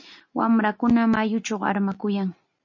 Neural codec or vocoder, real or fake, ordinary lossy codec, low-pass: none; real; MP3, 32 kbps; 7.2 kHz